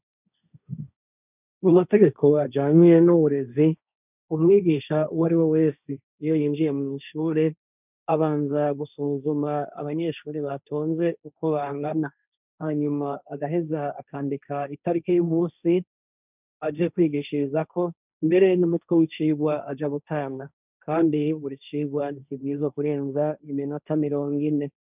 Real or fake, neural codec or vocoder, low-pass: fake; codec, 16 kHz, 1.1 kbps, Voila-Tokenizer; 3.6 kHz